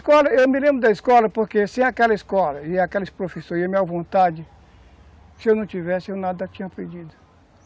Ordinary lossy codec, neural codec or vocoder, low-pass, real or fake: none; none; none; real